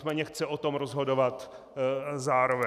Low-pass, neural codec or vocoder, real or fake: 14.4 kHz; none; real